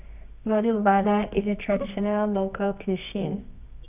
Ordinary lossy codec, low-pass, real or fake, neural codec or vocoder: none; 3.6 kHz; fake; codec, 24 kHz, 0.9 kbps, WavTokenizer, medium music audio release